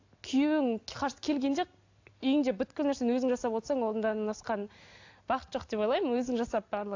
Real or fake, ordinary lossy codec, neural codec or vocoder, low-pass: real; AAC, 48 kbps; none; 7.2 kHz